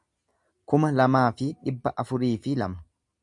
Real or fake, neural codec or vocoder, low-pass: real; none; 10.8 kHz